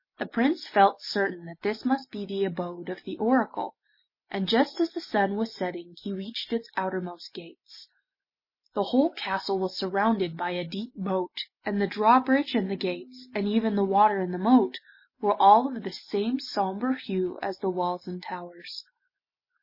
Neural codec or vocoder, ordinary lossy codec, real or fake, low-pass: none; MP3, 24 kbps; real; 5.4 kHz